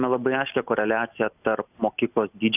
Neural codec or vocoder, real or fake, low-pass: none; real; 3.6 kHz